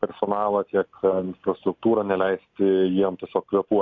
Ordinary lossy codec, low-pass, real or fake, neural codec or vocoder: AAC, 48 kbps; 7.2 kHz; real; none